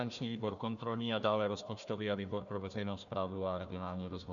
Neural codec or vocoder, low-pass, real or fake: codec, 16 kHz, 1 kbps, FunCodec, trained on Chinese and English, 50 frames a second; 7.2 kHz; fake